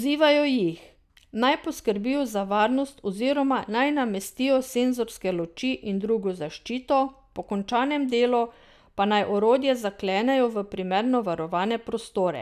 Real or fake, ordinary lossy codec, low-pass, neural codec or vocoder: real; none; 14.4 kHz; none